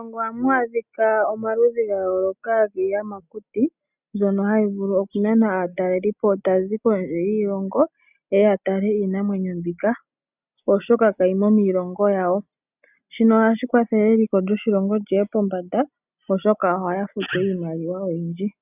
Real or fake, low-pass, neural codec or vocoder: real; 3.6 kHz; none